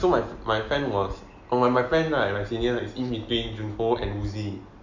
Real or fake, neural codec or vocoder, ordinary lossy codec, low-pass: real; none; none; 7.2 kHz